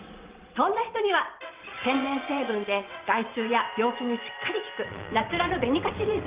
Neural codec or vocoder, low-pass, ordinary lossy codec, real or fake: vocoder, 22.05 kHz, 80 mel bands, WaveNeXt; 3.6 kHz; Opus, 24 kbps; fake